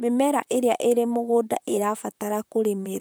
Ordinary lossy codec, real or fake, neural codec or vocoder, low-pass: none; fake; vocoder, 44.1 kHz, 128 mel bands, Pupu-Vocoder; none